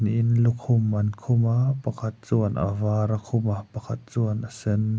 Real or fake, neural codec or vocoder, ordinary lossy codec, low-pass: real; none; none; none